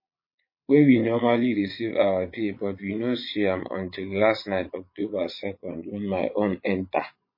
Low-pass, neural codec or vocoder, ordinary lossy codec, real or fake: 5.4 kHz; vocoder, 22.05 kHz, 80 mel bands, Vocos; MP3, 24 kbps; fake